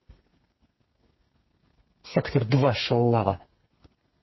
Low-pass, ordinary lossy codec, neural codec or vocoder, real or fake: 7.2 kHz; MP3, 24 kbps; codec, 32 kHz, 1.9 kbps, SNAC; fake